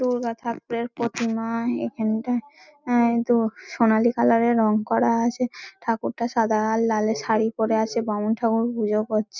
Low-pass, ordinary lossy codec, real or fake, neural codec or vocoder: 7.2 kHz; none; real; none